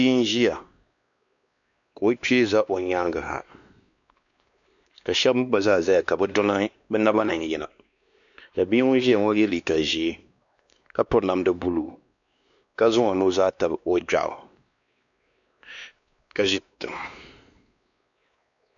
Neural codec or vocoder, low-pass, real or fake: codec, 16 kHz, 2 kbps, X-Codec, WavLM features, trained on Multilingual LibriSpeech; 7.2 kHz; fake